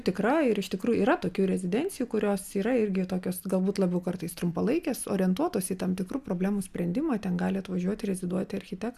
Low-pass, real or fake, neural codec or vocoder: 14.4 kHz; real; none